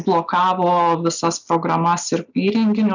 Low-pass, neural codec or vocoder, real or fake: 7.2 kHz; none; real